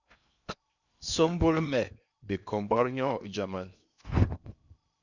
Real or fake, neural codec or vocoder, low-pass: fake; codec, 16 kHz in and 24 kHz out, 0.8 kbps, FocalCodec, streaming, 65536 codes; 7.2 kHz